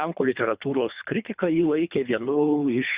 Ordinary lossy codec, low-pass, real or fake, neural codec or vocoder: Opus, 64 kbps; 3.6 kHz; fake; codec, 24 kHz, 3 kbps, HILCodec